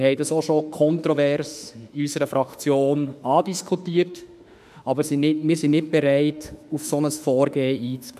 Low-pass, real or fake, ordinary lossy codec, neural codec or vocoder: 14.4 kHz; fake; none; autoencoder, 48 kHz, 32 numbers a frame, DAC-VAE, trained on Japanese speech